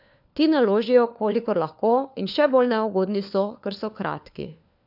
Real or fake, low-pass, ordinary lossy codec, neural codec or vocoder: fake; 5.4 kHz; none; codec, 16 kHz, 4 kbps, FunCodec, trained on LibriTTS, 50 frames a second